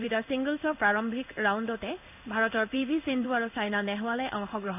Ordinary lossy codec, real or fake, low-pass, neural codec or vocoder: none; fake; 3.6 kHz; codec, 16 kHz in and 24 kHz out, 1 kbps, XY-Tokenizer